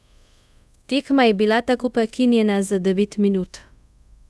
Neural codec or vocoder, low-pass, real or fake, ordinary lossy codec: codec, 24 kHz, 0.5 kbps, DualCodec; none; fake; none